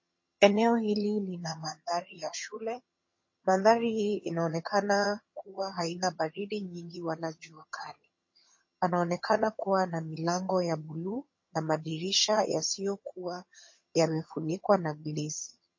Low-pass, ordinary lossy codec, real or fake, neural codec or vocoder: 7.2 kHz; MP3, 32 kbps; fake; vocoder, 22.05 kHz, 80 mel bands, HiFi-GAN